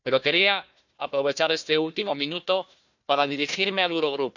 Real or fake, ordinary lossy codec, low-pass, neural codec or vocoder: fake; none; 7.2 kHz; codec, 16 kHz, 1 kbps, FunCodec, trained on Chinese and English, 50 frames a second